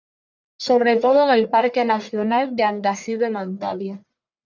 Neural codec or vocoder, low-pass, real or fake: codec, 44.1 kHz, 1.7 kbps, Pupu-Codec; 7.2 kHz; fake